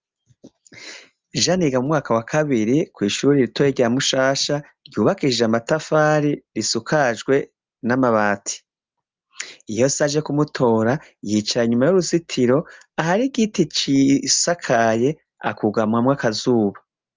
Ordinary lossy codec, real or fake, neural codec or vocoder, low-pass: Opus, 32 kbps; real; none; 7.2 kHz